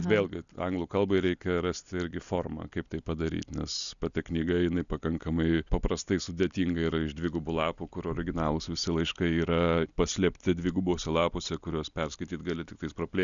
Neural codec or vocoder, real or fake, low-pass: none; real; 7.2 kHz